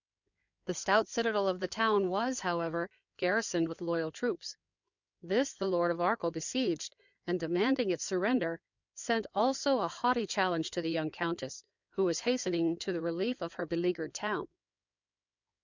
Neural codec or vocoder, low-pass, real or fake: codec, 16 kHz in and 24 kHz out, 2.2 kbps, FireRedTTS-2 codec; 7.2 kHz; fake